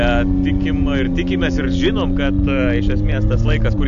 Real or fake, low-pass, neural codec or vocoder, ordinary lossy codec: real; 7.2 kHz; none; MP3, 96 kbps